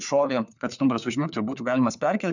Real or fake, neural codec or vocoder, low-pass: fake; codec, 16 kHz, 4 kbps, FunCodec, trained on LibriTTS, 50 frames a second; 7.2 kHz